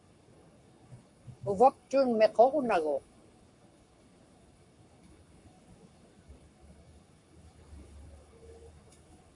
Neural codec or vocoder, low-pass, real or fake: codec, 44.1 kHz, 7.8 kbps, Pupu-Codec; 10.8 kHz; fake